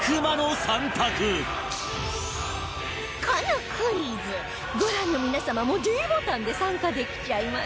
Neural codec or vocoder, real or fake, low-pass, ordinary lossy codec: none; real; none; none